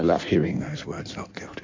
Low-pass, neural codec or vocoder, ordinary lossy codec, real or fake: 7.2 kHz; codec, 16 kHz in and 24 kHz out, 1.1 kbps, FireRedTTS-2 codec; MP3, 64 kbps; fake